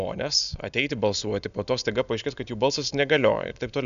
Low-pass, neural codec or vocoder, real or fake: 7.2 kHz; none; real